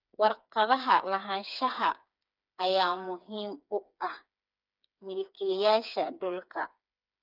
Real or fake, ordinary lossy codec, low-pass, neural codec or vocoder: fake; none; 5.4 kHz; codec, 16 kHz, 4 kbps, FreqCodec, smaller model